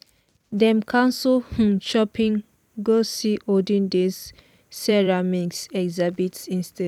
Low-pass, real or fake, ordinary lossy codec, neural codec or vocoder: 19.8 kHz; fake; none; vocoder, 44.1 kHz, 128 mel bands every 256 samples, BigVGAN v2